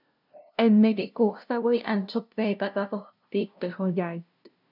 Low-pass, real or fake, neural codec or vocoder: 5.4 kHz; fake; codec, 16 kHz, 0.5 kbps, FunCodec, trained on LibriTTS, 25 frames a second